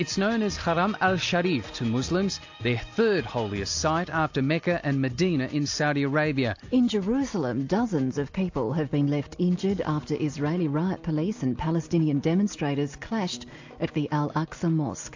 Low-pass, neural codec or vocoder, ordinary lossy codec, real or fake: 7.2 kHz; none; AAC, 48 kbps; real